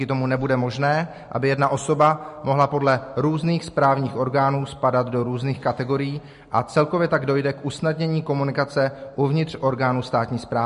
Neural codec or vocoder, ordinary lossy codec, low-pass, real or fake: none; MP3, 48 kbps; 10.8 kHz; real